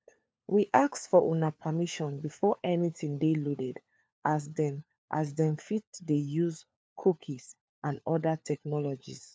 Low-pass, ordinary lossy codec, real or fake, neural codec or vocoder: none; none; fake; codec, 16 kHz, 4 kbps, FunCodec, trained on LibriTTS, 50 frames a second